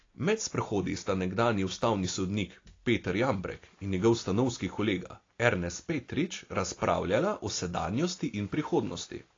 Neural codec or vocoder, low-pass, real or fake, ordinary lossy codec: none; 7.2 kHz; real; AAC, 32 kbps